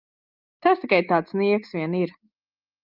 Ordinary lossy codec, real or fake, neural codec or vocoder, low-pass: Opus, 32 kbps; real; none; 5.4 kHz